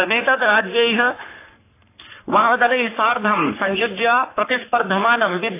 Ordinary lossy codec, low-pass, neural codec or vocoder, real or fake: AAC, 24 kbps; 3.6 kHz; codec, 44.1 kHz, 3.4 kbps, Pupu-Codec; fake